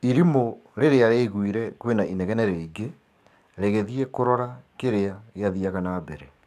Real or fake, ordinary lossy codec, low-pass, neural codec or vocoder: fake; none; 14.4 kHz; codec, 44.1 kHz, 7.8 kbps, DAC